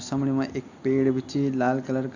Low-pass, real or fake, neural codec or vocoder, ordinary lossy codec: 7.2 kHz; real; none; AAC, 48 kbps